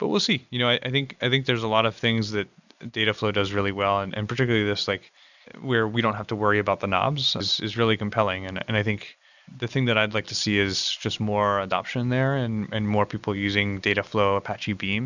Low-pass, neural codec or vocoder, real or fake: 7.2 kHz; none; real